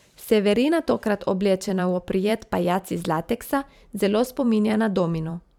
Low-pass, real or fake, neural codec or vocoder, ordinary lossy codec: 19.8 kHz; fake; vocoder, 44.1 kHz, 128 mel bands every 512 samples, BigVGAN v2; none